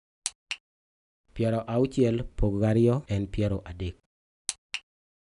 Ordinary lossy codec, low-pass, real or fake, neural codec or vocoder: none; 10.8 kHz; real; none